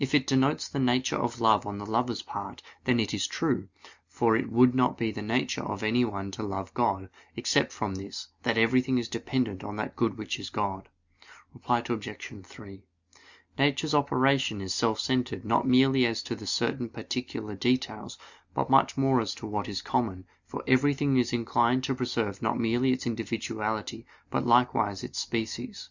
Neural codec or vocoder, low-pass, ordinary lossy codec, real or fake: none; 7.2 kHz; Opus, 64 kbps; real